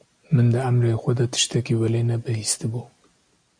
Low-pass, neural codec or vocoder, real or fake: 9.9 kHz; none; real